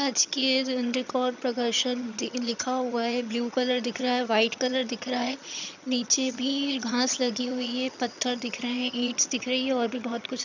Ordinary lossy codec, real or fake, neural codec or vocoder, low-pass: none; fake; vocoder, 22.05 kHz, 80 mel bands, HiFi-GAN; 7.2 kHz